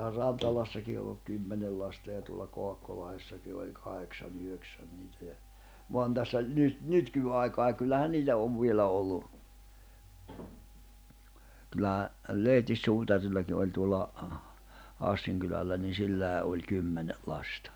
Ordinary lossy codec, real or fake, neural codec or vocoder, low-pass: none; real; none; none